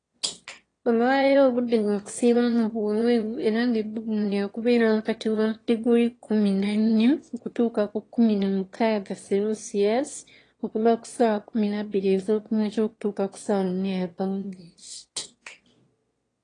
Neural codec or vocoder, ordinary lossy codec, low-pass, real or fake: autoencoder, 22.05 kHz, a latent of 192 numbers a frame, VITS, trained on one speaker; AAC, 32 kbps; 9.9 kHz; fake